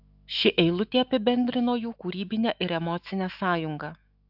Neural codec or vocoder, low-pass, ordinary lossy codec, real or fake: autoencoder, 48 kHz, 128 numbers a frame, DAC-VAE, trained on Japanese speech; 5.4 kHz; AAC, 48 kbps; fake